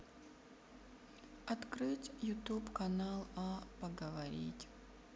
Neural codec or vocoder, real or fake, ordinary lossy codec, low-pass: none; real; none; none